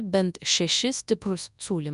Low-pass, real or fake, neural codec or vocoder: 10.8 kHz; fake; codec, 24 kHz, 0.9 kbps, WavTokenizer, large speech release